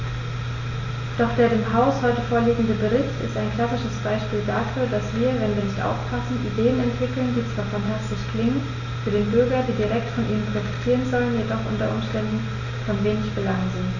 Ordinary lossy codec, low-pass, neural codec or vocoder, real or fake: none; 7.2 kHz; none; real